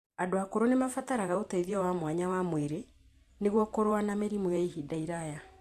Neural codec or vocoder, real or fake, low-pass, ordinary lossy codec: vocoder, 44.1 kHz, 128 mel bands every 512 samples, BigVGAN v2; fake; 14.4 kHz; AAC, 64 kbps